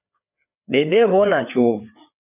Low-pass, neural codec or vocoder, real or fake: 3.6 kHz; codec, 16 kHz, 4 kbps, FreqCodec, larger model; fake